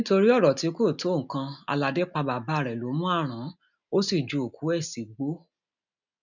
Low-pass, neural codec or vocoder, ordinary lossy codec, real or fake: 7.2 kHz; none; none; real